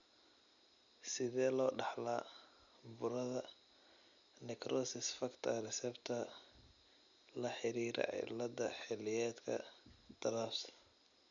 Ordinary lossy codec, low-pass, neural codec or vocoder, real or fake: none; 7.2 kHz; none; real